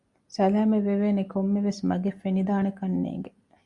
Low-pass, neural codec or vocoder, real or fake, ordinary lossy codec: 10.8 kHz; none; real; Opus, 64 kbps